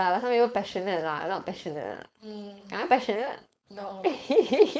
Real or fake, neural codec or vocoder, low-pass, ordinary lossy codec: fake; codec, 16 kHz, 4.8 kbps, FACodec; none; none